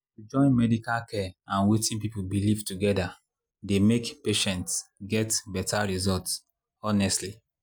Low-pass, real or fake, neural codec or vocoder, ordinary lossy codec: none; real; none; none